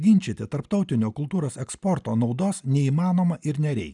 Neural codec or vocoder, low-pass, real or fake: none; 10.8 kHz; real